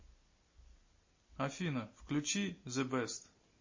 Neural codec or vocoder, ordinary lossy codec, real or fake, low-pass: none; MP3, 32 kbps; real; 7.2 kHz